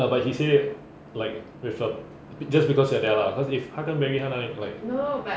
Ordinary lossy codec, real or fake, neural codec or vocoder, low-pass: none; real; none; none